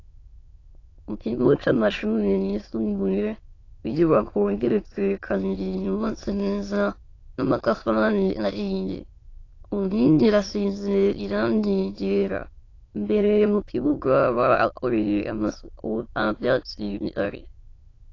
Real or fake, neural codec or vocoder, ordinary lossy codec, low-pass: fake; autoencoder, 22.05 kHz, a latent of 192 numbers a frame, VITS, trained on many speakers; AAC, 32 kbps; 7.2 kHz